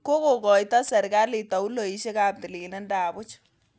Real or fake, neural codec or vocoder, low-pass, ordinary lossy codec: real; none; none; none